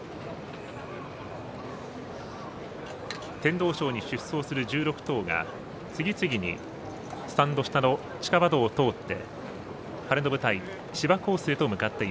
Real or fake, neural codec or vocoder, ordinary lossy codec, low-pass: real; none; none; none